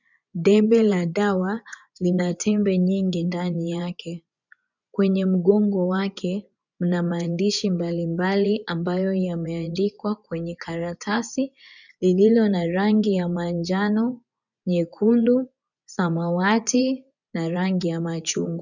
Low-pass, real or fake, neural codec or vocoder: 7.2 kHz; fake; vocoder, 24 kHz, 100 mel bands, Vocos